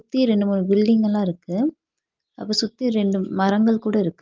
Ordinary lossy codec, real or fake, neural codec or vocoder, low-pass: Opus, 32 kbps; real; none; 7.2 kHz